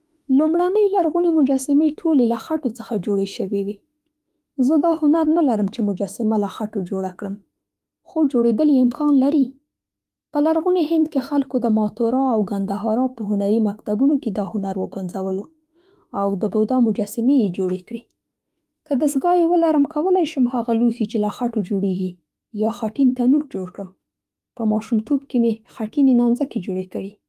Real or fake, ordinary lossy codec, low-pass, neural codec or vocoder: fake; Opus, 32 kbps; 14.4 kHz; autoencoder, 48 kHz, 32 numbers a frame, DAC-VAE, trained on Japanese speech